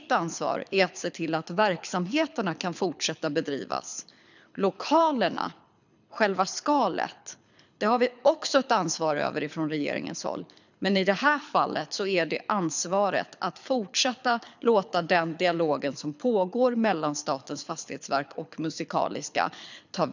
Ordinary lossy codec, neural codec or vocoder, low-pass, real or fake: none; codec, 24 kHz, 6 kbps, HILCodec; 7.2 kHz; fake